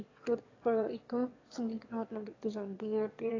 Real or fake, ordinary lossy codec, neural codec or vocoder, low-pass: fake; AAC, 32 kbps; autoencoder, 22.05 kHz, a latent of 192 numbers a frame, VITS, trained on one speaker; 7.2 kHz